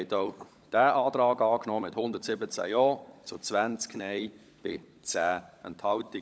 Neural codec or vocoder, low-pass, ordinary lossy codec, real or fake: codec, 16 kHz, 16 kbps, FunCodec, trained on LibriTTS, 50 frames a second; none; none; fake